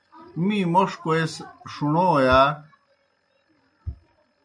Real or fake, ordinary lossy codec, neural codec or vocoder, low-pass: real; AAC, 64 kbps; none; 9.9 kHz